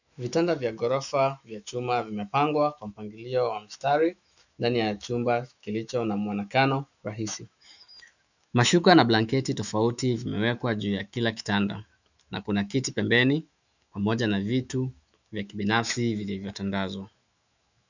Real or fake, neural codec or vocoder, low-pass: fake; autoencoder, 48 kHz, 128 numbers a frame, DAC-VAE, trained on Japanese speech; 7.2 kHz